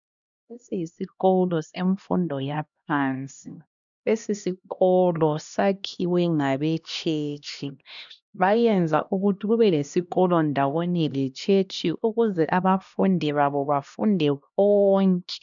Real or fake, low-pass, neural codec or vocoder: fake; 7.2 kHz; codec, 16 kHz, 1 kbps, X-Codec, HuBERT features, trained on LibriSpeech